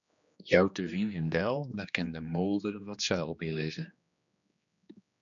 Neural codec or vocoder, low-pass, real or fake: codec, 16 kHz, 2 kbps, X-Codec, HuBERT features, trained on general audio; 7.2 kHz; fake